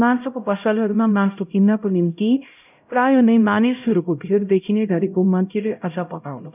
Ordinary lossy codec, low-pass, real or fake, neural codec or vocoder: none; 3.6 kHz; fake; codec, 16 kHz, 0.5 kbps, X-Codec, HuBERT features, trained on LibriSpeech